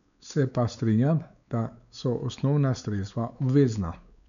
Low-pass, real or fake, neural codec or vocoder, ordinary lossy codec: 7.2 kHz; fake; codec, 16 kHz, 4 kbps, X-Codec, WavLM features, trained on Multilingual LibriSpeech; none